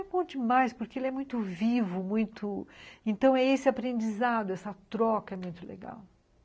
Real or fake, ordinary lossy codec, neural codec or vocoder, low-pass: real; none; none; none